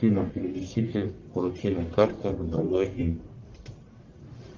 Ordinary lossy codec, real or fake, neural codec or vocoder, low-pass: Opus, 24 kbps; fake; codec, 44.1 kHz, 1.7 kbps, Pupu-Codec; 7.2 kHz